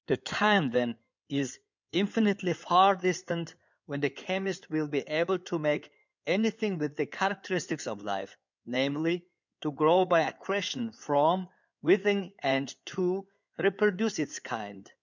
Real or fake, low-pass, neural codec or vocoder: fake; 7.2 kHz; codec, 16 kHz in and 24 kHz out, 2.2 kbps, FireRedTTS-2 codec